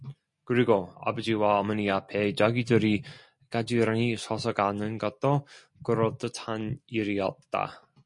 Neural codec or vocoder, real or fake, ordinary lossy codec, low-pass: none; real; MP3, 48 kbps; 10.8 kHz